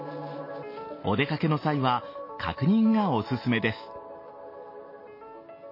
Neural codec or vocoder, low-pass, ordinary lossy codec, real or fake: none; 5.4 kHz; MP3, 24 kbps; real